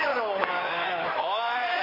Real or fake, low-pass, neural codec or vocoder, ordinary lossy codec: fake; 5.4 kHz; codec, 16 kHz in and 24 kHz out, 2.2 kbps, FireRedTTS-2 codec; none